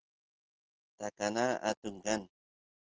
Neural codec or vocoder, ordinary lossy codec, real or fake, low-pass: none; Opus, 16 kbps; real; 7.2 kHz